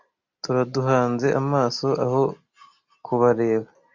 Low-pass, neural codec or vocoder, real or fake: 7.2 kHz; none; real